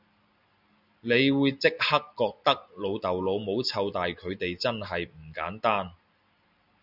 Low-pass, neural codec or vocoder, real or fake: 5.4 kHz; none; real